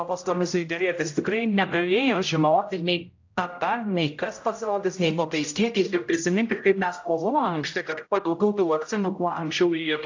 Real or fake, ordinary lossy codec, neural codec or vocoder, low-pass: fake; AAC, 48 kbps; codec, 16 kHz, 0.5 kbps, X-Codec, HuBERT features, trained on general audio; 7.2 kHz